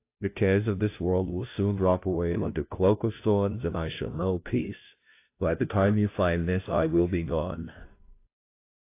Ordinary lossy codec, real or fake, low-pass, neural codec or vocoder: AAC, 24 kbps; fake; 3.6 kHz; codec, 16 kHz, 0.5 kbps, FunCodec, trained on Chinese and English, 25 frames a second